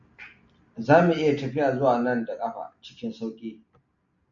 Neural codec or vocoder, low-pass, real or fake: none; 7.2 kHz; real